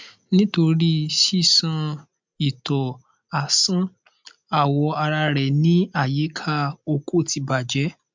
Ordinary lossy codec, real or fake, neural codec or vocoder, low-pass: MP3, 64 kbps; real; none; 7.2 kHz